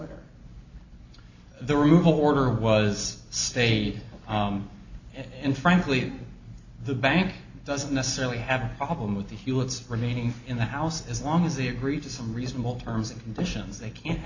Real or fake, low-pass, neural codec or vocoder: real; 7.2 kHz; none